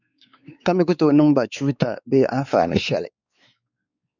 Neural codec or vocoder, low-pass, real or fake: codec, 16 kHz, 4 kbps, X-Codec, WavLM features, trained on Multilingual LibriSpeech; 7.2 kHz; fake